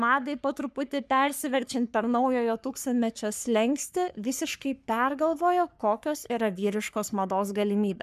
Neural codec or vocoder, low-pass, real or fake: codec, 44.1 kHz, 3.4 kbps, Pupu-Codec; 14.4 kHz; fake